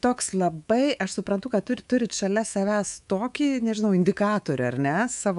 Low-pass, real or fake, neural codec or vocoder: 10.8 kHz; fake; codec, 24 kHz, 3.1 kbps, DualCodec